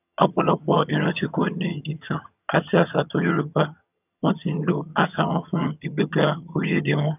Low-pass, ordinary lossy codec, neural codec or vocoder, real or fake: 3.6 kHz; none; vocoder, 22.05 kHz, 80 mel bands, HiFi-GAN; fake